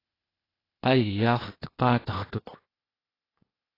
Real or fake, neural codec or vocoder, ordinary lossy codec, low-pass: fake; codec, 16 kHz, 0.8 kbps, ZipCodec; AAC, 24 kbps; 5.4 kHz